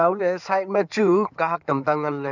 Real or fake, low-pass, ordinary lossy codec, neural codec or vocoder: fake; 7.2 kHz; none; codec, 16 kHz in and 24 kHz out, 2.2 kbps, FireRedTTS-2 codec